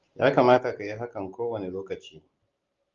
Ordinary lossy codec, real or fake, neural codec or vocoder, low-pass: Opus, 32 kbps; real; none; 7.2 kHz